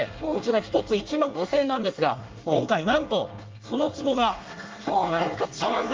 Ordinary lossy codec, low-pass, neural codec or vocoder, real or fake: Opus, 24 kbps; 7.2 kHz; codec, 24 kHz, 1 kbps, SNAC; fake